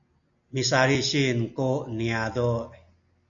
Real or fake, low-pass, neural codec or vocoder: real; 7.2 kHz; none